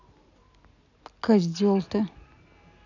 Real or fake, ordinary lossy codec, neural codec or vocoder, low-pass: real; none; none; 7.2 kHz